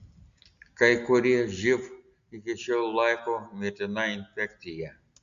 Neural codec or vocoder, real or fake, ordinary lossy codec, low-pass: none; real; Opus, 64 kbps; 7.2 kHz